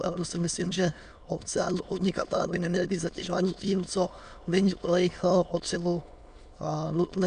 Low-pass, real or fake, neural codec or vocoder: 9.9 kHz; fake; autoencoder, 22.05 kHz, a latent of 192 numbers a frame, VITS, trained on many speakers